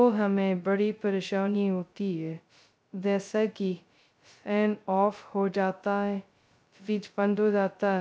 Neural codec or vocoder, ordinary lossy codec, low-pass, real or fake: codec, 16 kHz, 0.2 kbps, FocalCodec; none; none; fake